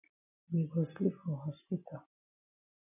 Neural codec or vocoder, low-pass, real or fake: none; 3.6 kHz; real